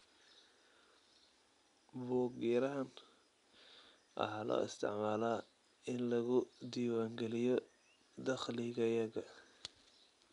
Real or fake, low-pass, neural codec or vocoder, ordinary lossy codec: real; 10.8 kHz; none; none